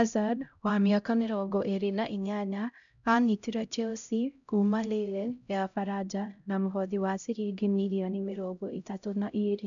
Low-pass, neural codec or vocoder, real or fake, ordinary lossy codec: 7.2 kHz; codec, 16 kHz, 0.5 kbps, X-Codec, HuBERT features, trained on LibriSpeech; fake; none